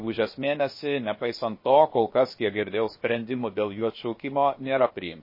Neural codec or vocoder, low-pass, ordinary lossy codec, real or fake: codec, 16 kHz, about 1 kbps, DyCAST, with the encoder's durations; 5.4 kHz; MP3, 24 kbps; fake